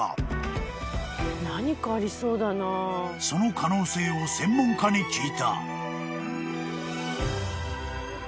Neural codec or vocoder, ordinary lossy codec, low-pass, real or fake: none; none; none; real